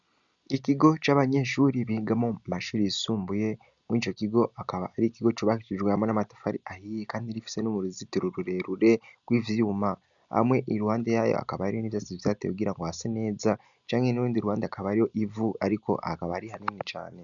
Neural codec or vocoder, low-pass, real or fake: none; 7.2 kHz; real